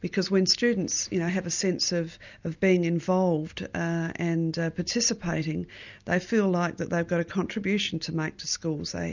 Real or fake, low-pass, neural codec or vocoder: real; 7.2 kHz; none